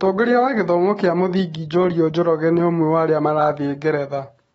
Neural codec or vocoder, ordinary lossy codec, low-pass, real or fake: autoencoder, 48 kHz, 128 numbers a frame, DAC-VAE, trained on Japanese speech; AAC, 24 kbps; 19.8 kHz; fake